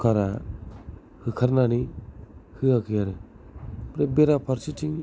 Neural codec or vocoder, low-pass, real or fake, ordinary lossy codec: none; none; real; none